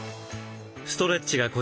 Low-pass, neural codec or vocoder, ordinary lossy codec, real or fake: none; none; none; real